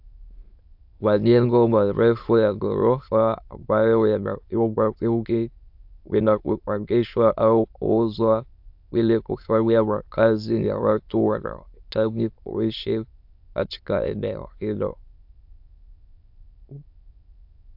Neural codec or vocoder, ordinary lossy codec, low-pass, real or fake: autoencoder, 22.05 kHz, a latent of 192 numbers a frame, VITS, trained on many speakers; AAC, 48 kbps; 5.4 kHz; fake